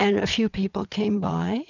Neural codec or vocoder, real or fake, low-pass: vocoder, 22.05 kHz, 80 mel bands, WaveNeXt; fake; 7.2 kHz